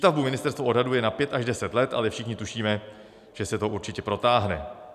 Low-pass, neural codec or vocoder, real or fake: 14.4 kHz; none; real